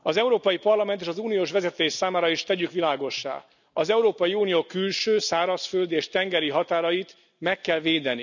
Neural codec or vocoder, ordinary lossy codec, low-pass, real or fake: none; none; 7.2 kHz; real